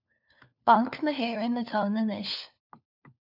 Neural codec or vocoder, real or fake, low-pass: codec, 16 kHz, 4 kbps, FunCodec, trained on LibriTTS, 50 frames a second; fake; 5.4 kHz